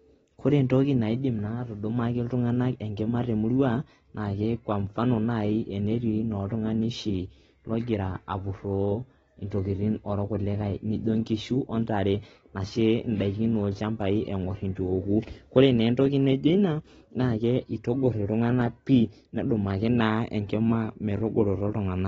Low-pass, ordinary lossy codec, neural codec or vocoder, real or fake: 19.8 kHz; AAC, 24 kbps; none; real